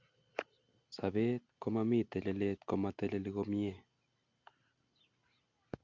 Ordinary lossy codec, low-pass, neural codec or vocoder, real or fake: Opus, 64 kbps; 7.2 kHz; none; real